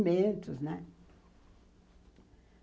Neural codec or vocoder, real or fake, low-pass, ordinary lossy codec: none; real; none; none